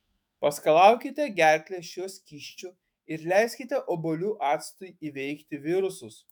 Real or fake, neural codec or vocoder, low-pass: fake; autoencoder, 48 kHz, 128 numbers a frame, DAC-VAE, trained on Japanese speech; 19.8 kHz